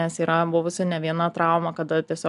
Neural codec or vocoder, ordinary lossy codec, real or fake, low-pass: none; MP3, 96 kbps; real; 10.8 kHz